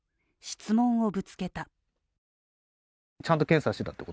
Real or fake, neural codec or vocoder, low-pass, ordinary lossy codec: real; none; none; none